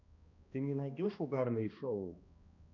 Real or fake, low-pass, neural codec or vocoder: fake; 7.2 kHz; codec, 16 kHz, 1 kbps, X-Codec, HuBERT features, trained on balanced general audio